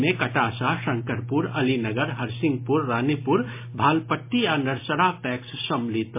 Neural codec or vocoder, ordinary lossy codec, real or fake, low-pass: none; MP3, 24 kbps; real; 3.6 kHz